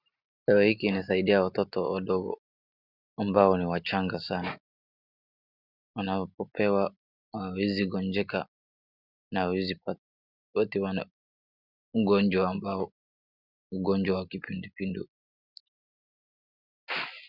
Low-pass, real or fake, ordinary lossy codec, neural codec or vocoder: 5.4 kHz; real; Opus, 64 kbps; none